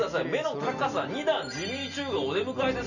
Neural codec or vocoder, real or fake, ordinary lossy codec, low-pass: none; real; none; 7.2 kHz